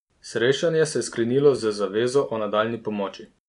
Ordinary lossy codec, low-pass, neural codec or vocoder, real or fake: none; 10.8 kHz; none; real